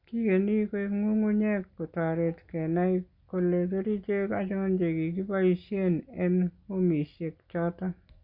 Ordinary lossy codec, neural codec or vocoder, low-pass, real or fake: Opus, 64 kbps; none; 5.4 kHz; real